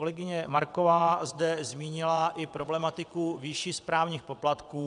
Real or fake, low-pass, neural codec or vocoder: fake; 9.9 kHz; vocoder, 22.05 kHz, 80 mel bands, WaveNeXt